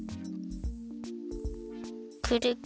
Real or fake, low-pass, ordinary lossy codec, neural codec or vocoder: real; none; none; none